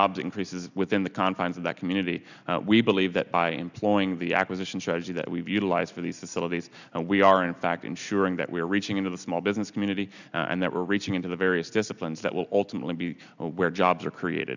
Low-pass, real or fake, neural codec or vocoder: 7.2 kHz; real; none